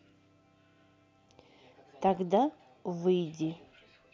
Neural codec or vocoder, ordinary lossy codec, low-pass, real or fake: none; none; none; real